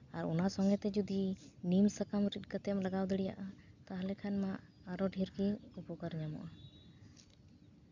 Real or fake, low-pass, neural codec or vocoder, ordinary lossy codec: real; 7.2 kHz; none; none